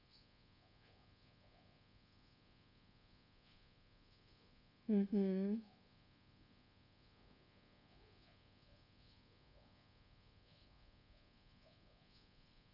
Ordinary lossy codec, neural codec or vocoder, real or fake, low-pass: none; codec, 24 kHz, 1.2 kbps, DualCodec; fake; 5.4 kHz